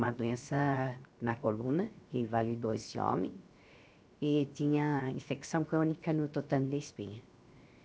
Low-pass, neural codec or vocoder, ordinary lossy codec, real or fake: none; codec, 16 kHz, 0.7 kbps, FocalCodec; none; fake